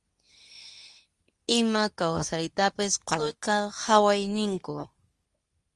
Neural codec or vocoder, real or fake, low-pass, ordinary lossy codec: codec, 24 kHz, 0.9 kbps, WavTokenizer, medium speech release version 2; fake; 10.8 kHz; Opus, 32 kbps